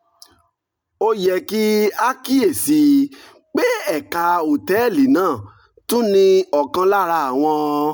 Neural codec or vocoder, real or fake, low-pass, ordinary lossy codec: none; real; none; none